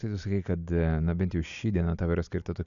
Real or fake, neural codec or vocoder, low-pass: real; none; 7.2 kHz